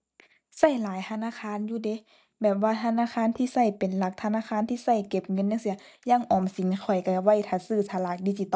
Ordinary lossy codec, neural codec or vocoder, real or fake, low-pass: none; none; real; none